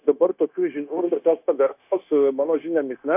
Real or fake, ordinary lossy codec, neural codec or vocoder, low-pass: fake; AAC, 32 kbps; codec, 16 kHz, 0.9 kbps, LongCat-Audio-Codec; 3.6 kHz